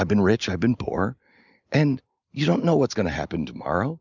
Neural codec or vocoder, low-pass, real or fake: none; 7.2 kHz; real